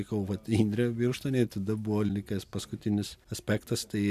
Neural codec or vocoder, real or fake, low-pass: none; real; 14.4 kHz